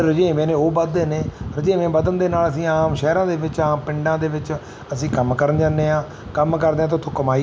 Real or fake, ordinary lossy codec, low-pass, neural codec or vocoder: real; none; none; none